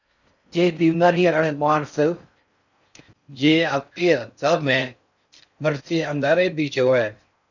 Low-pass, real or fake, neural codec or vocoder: 7.2 kHz; fake; codec, 16 kHz in and 24 kHz out, 0.6 kbps, FocalCodec, streaming, 4096 codes